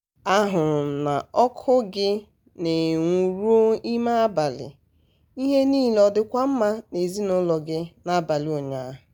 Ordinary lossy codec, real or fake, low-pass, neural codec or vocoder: none; real; none; none